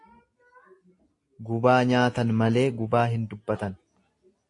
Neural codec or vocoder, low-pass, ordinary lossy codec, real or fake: none; 10.8 kHz; AAC, 48 kbps; real